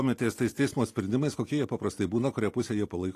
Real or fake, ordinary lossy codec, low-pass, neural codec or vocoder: real; AAC, 48 kbps; 14.4 kHz; none